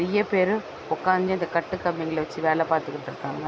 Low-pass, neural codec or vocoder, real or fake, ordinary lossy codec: none; none; real; none